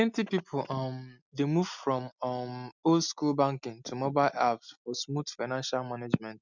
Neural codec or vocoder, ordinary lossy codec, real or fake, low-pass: none; none; real; 7.2 kHz